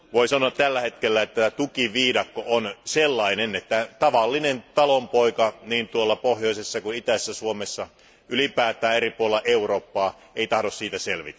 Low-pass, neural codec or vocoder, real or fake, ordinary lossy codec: none; none; real; none